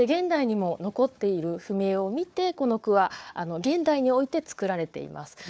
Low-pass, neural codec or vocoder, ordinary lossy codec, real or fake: none; codec, 16 kHz, 4 kbps, FunCodec, trained on Chinese and English, 50 frames a second; none; fake